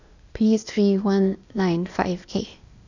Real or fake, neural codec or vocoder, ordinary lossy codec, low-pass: fake; codec, 16 kHz, 0.8 kbps, ZipCodec; none; 7.2 kHz